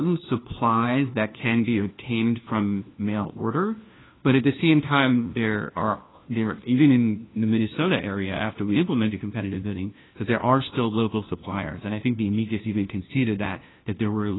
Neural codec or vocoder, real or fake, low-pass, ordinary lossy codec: codec, 16 kHz, 1 kbps, FunCodec, trained on LibriTTS, 50 frames a second; fake; 7.2 kHz; AAC, 16 kbps